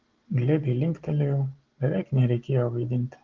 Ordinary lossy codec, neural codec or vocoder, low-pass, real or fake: Opus, 16 kbps; none; 7.2 kHz; real